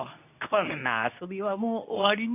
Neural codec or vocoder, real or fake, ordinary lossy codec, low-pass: codec, 24 kHz, 0.9 kbps, WavTokenizer, medium speech release version 2; fake; none; 3.6 kHz